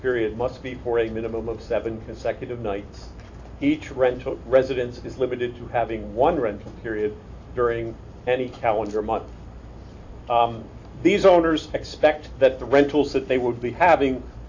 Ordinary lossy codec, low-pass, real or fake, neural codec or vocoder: AAC, 48 kbps; 7.2 kHz; real; none